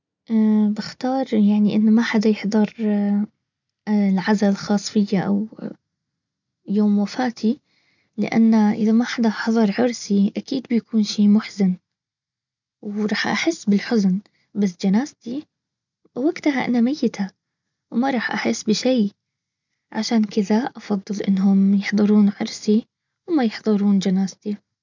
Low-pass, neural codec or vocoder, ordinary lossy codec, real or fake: 7.2 kHz; none; none; real